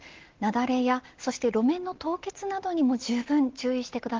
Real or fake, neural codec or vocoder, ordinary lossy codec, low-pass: real; none; Opus, 16 kbps; 7.2 kHz